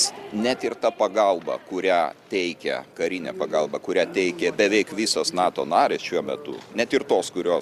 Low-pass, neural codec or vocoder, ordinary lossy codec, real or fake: 14.4 kHz; none; Opus, 64 kbps; real